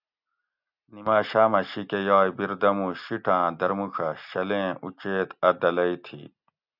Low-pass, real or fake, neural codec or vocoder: 5.4 kHz; real; none